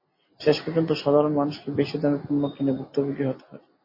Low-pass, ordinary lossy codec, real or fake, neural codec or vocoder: 5.4 kHz; MP3, 24 kbps; real; none